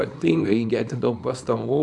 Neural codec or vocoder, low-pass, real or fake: codec, 24 kHz, 0.9 kbps, WavTokenizer, small release; 10.8 kHz; fake